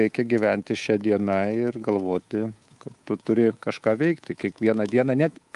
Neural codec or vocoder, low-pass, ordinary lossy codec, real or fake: codec, 24 kHz, 3.1 kbps, DualCodec; 10.8 kHz; Opus, 32 kbps; fake